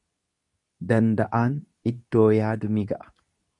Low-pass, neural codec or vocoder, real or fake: 10.8 kHz; codec, 24 kHz, 0.9 kbps, WavTokenizer, medium speech release version 2; fake